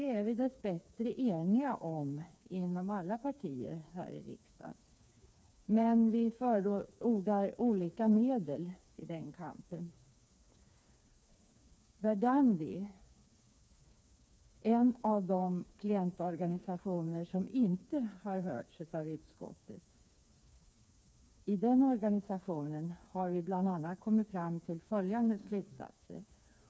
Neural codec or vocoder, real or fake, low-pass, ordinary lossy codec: codec, 16 kHz, 4 kbps, FreqCodec, smaller model; fake; none; none